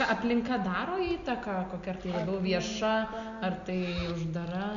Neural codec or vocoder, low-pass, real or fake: none; 7.2 kHz; real